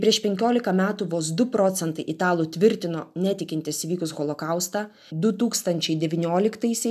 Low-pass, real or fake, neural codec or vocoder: 14.4 kHz; real; none